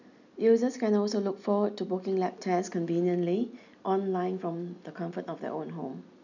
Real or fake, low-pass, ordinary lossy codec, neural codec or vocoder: real; 7.2 kHz; none; none